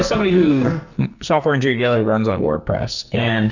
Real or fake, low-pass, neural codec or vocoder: fake; 7.2 kHz; codec, 44.1 kHz, 2.6 kbps, SNAC